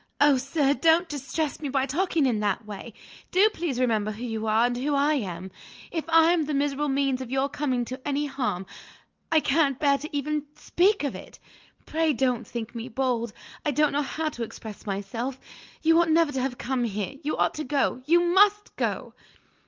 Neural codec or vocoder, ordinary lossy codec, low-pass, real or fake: none; Opus, 24 kbps; 7.2 kHz; real